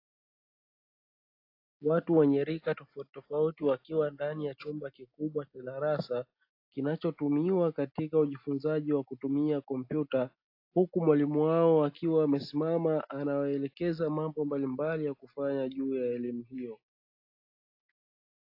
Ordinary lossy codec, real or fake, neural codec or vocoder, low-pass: AAC, 32 kbps; real; none; 5.4 kHz